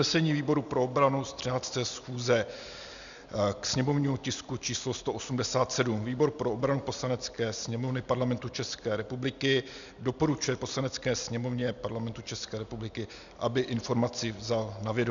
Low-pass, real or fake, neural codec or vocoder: 7.2 kHz; real; none